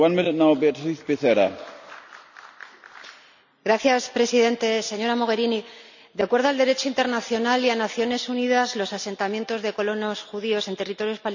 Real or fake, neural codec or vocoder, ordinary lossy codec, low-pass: real; none; none; 7.2 kHz